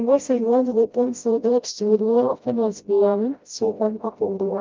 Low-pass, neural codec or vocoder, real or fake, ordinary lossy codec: 7.2 kHz; codec, 16 kHz, 0.5 kbps, FreqCodec, smaller model; fake; Opus, 24 kbps